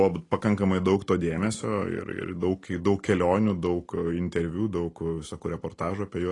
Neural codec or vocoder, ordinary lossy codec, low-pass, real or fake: none; AAC, 32 kbps; 10.8 kHz; real